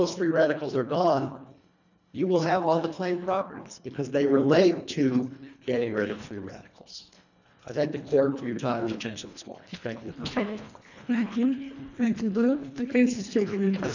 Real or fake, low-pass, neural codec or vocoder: fake; 7.2 kHz; codec, 24 kHz, 1.5 kbps, HILCodec